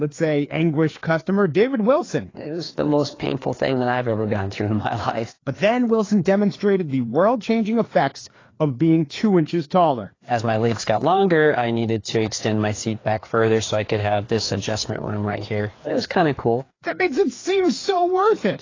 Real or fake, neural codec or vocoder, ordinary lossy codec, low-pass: fake; codec, 16 kHz, 2 kbps, FreqCodec, larger model; AAC, 32 kbps; 7.2 kHz